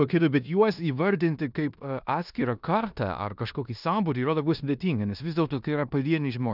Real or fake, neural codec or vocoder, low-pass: fake; codec, 16 kHz in and 24 kHz out, 0.9 kbps, LongCat-Audio-Codec, four codebook decoder; 5.4 kHz